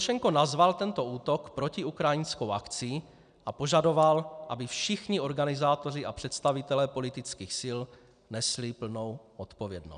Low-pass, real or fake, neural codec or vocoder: 9.9 kHz; real; none